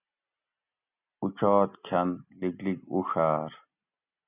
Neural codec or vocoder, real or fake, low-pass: none; real; 3.6 kHz